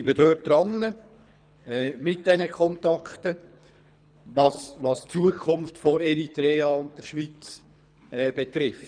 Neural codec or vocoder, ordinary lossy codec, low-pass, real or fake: codec, 24 kHz, 3 kbps, HILCodec; none; 9.9 kHz; fake